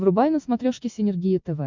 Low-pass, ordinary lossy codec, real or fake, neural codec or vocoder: 7.2 kHz; MP3, 64 kbps; real; none